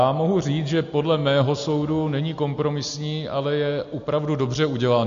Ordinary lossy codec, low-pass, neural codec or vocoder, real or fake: MP3, 48 kbps; 7.2 kHz; none; real